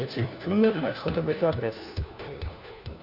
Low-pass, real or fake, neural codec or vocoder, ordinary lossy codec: 5.4 kHz; fake; codec, 16 kHz, 1 kbps, FunCodec, trained on LibriTTS, 50 frames a second; Opus, 64 kbps